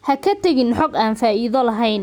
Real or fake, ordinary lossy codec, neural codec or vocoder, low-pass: real; none; none; 19.8 kHz